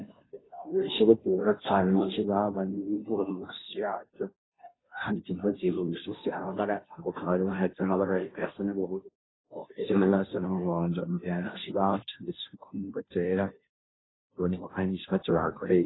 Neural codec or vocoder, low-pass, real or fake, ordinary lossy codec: codec, 16 kHz, 0.5 kbps, FunCodec, trained on Chinese and English, 25 frames a second; 7.2 kHz; fake; AAC, 16 kbps